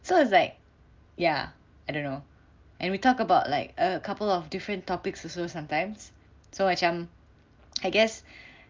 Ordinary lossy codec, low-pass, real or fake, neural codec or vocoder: Opus, 24 kbps; 7.2 kHz; real; none